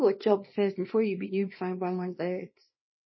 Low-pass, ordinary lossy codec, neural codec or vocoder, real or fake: 7.2 kHz; MP3, 24 kbps; codec, 24 kHz, 0.9 kbps, WavTokenizer, small release; fake